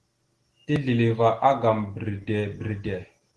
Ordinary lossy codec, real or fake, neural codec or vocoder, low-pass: Opus, 16 kbps; real; none; 10.8 kHz